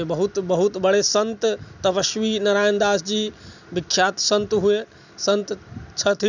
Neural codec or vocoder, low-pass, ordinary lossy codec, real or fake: none; 7.2 kHz; none; real